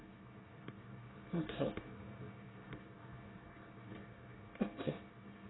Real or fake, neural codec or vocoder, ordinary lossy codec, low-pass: fake; codec, 24 kHz, 1 kbps, SNAC; AAC, 16 kbps; 7.2 kHz